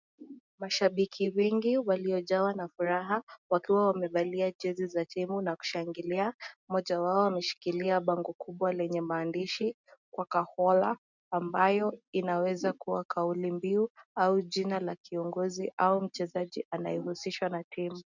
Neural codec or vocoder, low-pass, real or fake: none; 7.2 kHz; real